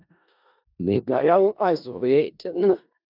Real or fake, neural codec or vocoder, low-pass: fake; codec, 16 kHz in and 24 kHz out, 0.4 kbps, LongCat-Audio-Codec, four codebook decoder; 5.4 kHz